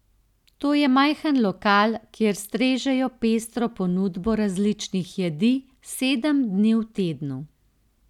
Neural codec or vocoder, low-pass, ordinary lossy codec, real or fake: none; 19.8 kHz; none; real